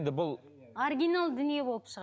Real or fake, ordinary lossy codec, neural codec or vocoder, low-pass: real; none; none; none